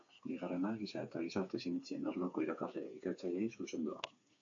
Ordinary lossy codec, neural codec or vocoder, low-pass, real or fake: AAC, 48 kbps; codec, 16 kHz, 4 kbps, FreqCodec, smaller model; 7.2 kHz; fake